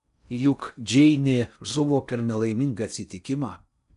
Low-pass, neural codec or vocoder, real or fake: 10.8 kHz; codec, 16 kHz in and 24 kHz out, 0.6 kbps, FocalCodec, streaming, 4096 codes; fake